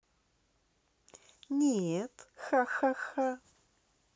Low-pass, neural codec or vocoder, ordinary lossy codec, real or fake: none; none; none; real